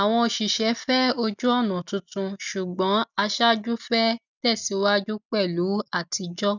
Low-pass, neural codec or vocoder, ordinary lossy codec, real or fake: 7.2 kHz; none; none; real